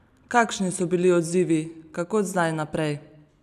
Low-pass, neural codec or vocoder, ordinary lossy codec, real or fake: 14.4 kHz; none; none; real